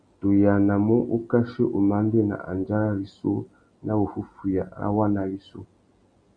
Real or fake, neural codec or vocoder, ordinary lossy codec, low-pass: real; none; Opus, 64 kbps; 9.9 kHz